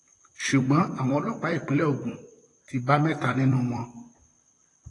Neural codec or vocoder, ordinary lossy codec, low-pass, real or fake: vocoder, 44.1 kHz, 128 mel bands, Pupu-Vocoder; AAC, 32 kbps; 10.8 kHz; fake